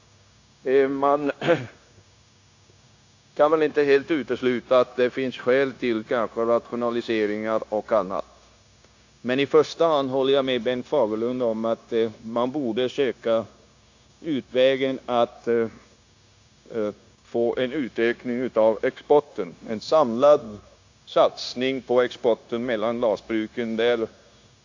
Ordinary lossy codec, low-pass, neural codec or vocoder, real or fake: AAC, 48 kbps; 7.2 kHz; codec, 16 kHz, 0.9 kbps, LongCat-Audio-Codec; fake